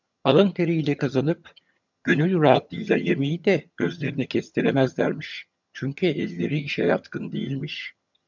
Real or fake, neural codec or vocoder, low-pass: fake; vocoder, 22.05 kHz, 80 mel bands, HiFi-GAN; 7.2 kHz